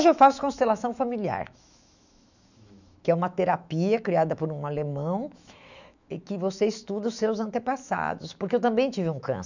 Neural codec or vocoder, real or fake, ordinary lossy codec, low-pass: autoencoder, 48 kHz, 128 numbers a frame, DAC-VAE, trained on Japanese speech; fake; none; 7.2 kHz